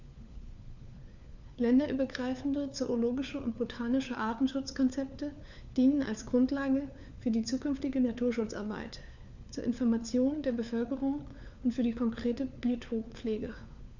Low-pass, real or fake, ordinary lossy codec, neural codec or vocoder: 7.2 kHz; fake; none; codec, 16 kHz, 4 kbps, FunCodec, trained on LibriTTS, 50 frames a second